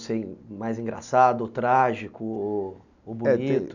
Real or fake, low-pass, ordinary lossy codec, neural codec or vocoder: real; 7.2 kHz; none; none